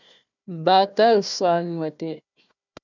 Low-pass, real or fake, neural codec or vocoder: 7.2 kHz; fake; codec, 16 kHz, 1 kbps, FunCodec, trained on Chinese and English, 50 frames a second